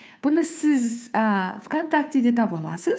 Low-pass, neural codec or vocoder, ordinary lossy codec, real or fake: none; codec, 16 kHz, 2 kbps, FunCodec, trained on Chinese and English, 25 frames a second; none; fake